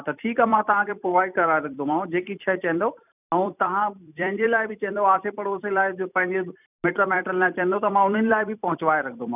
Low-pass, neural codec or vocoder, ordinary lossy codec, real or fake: 3.6 kHz; vocoder, 44.1 kHz, 128 mel bands every 256 samples, BigVGAN v2; none; fake